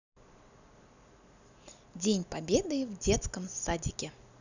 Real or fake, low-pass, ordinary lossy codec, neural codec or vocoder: real; 7.2 kHz; none; none